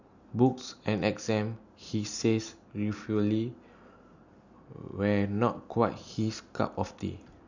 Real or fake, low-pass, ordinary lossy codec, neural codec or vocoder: real; 7.2 kHz; none; none